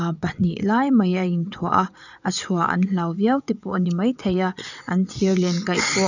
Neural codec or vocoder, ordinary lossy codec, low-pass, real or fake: vocoder, 22.05 kHz, 80 mel bands, WaveNeXt; none; 7.2 kHz; fake